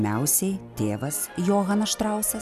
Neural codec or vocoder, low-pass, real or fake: none; 14.4 kHz; real